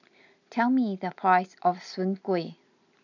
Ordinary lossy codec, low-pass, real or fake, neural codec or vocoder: none; 7.2 kHz; real; none